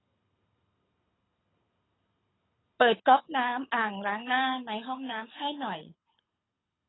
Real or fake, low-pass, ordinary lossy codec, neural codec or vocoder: fake; 7.2 kHz; AAC, 16 kbps; codec, 24 kHz, 6 kbps, HILCodec